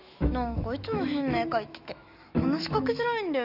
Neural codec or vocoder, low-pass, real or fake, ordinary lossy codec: autoencoder, 48 kHz, 128 numbers a frame, DAC-VAE, trained on Japanese speech; 5.4 kHz; fake; none